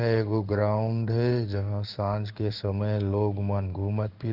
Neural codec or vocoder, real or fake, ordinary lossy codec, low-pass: codec, 16 kHz in and 24 kHz out, 1 kbps, XY-Tokenizer; fake; Opus, 32 kbps; 5.4 kHz